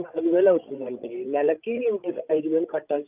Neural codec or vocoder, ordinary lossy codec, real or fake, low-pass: codec, 16 kHz, 16 kbps, FreqCodec, larger model; Opus, 24 kbps; fake; 3.6 kHz